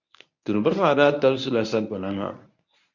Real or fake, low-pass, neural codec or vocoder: fake; 7.2 kHz; codec, 24 kHz, 0.9 kbps, WavTokenizer, medium speech release version 1